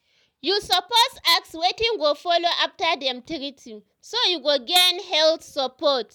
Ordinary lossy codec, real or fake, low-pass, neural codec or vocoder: none; real; none; none